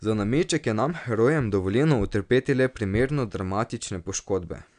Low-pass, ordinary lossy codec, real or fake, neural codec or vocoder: 9.9 kHz; none; real; none